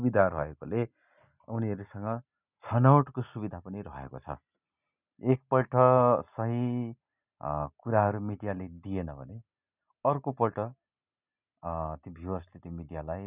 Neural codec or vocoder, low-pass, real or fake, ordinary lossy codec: none; 3.6 kHz; real; none